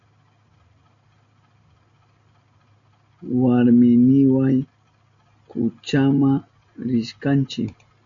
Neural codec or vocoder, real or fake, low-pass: none; real; 7.2 kHz